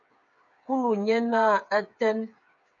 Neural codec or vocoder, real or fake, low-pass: codec, 16 kHz, 8 kbps, FreqCodec, smaller model; fake; 7.2 kHz